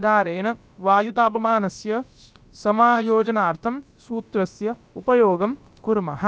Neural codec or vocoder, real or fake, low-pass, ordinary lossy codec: codec, 16 kHz, about 1 kbps, DyCAST, with the encoder's durations; fake; none; none